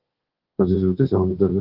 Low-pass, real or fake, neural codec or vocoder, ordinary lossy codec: 5.4 kHz; fake; codec, 24 kHz, 1.2 kbps, DualCodec; Opus, 16 kbps